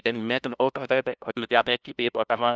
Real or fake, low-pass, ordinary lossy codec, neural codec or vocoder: fake; none; none; codec, 16 kHz, 0.5 kbps, FunCodec, trained on LibriTTS, 25 frames a second